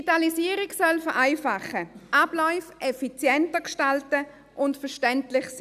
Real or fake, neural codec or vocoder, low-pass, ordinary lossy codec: real; none; 14.4 kHz; none